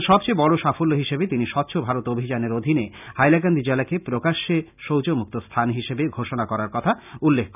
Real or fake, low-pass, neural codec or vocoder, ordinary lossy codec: real; 3.6 kHz; none; none